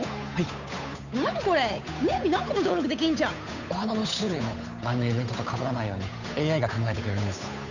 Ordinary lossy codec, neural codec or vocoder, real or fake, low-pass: none; codec, 16 kHz, 8 kbps, FunCodec, trained on Chinese and English, 25 frames a second; fake; 7.2 kHz